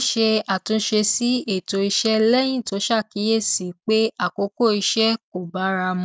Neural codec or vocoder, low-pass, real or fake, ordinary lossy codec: none; none; real; none